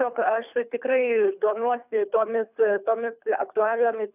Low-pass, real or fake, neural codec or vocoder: 3.6 kHz; fake; codec, 24 kHz, 3 kbps, HILCodec